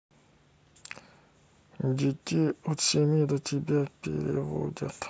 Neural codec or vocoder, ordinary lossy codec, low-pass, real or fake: none; none; none; real